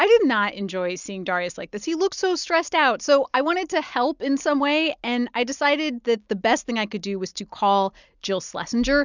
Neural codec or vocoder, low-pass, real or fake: none; 7.2 kHz; real